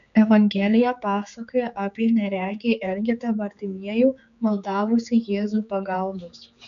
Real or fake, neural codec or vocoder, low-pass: fake; codec, 16 kHz, 4 kbps, X-Codec, HuBERT features, trained on general audio; 7.2 kHz